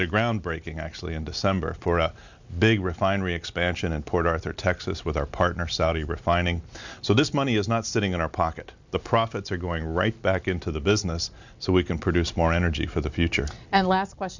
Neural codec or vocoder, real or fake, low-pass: none; real; 7.2 kHz